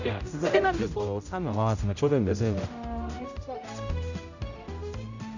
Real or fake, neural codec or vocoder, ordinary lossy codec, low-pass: fake; codec, 16 kHz, 0.5 kbps, X-Codec, HuBERT features, trained on general audio; none; 7.2 kHz